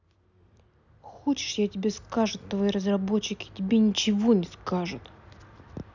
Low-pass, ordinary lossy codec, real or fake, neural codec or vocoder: 7.2 kHz; none; real; none